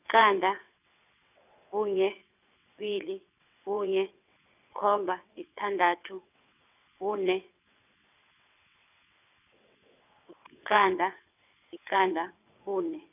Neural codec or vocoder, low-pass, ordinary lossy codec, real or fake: vocoder, 22.05 kHz, 80 mel bands, WaveNeXt; 3.6 kHz; none; fake